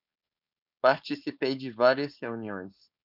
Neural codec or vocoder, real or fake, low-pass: codec, 16 kHz, 4.8 kbps, FACodec; fake; 5.4 kHz